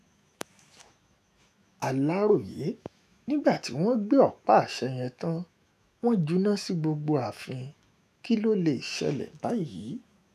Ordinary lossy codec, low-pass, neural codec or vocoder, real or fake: none; 14.4 kHz; autoencoder, 48 kHz, 128 numbers a frame, DAC-VAE, trained on Japanese speech; fake